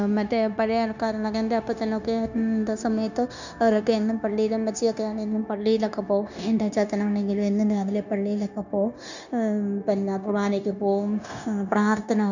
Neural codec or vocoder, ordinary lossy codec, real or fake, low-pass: codec, 16 kHz, 0.9 kbps, LongCat-Audio-Codec; none; fake; 7.2 kHz